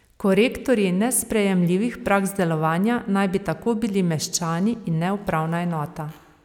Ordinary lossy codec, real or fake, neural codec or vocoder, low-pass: none; real; none; 19.8 kHz